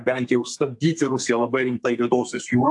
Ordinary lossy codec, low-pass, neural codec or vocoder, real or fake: AAC, 64 kbps; 10.8 kHz; codec, 32 kHz, 1.9 kbps, SNAC; fake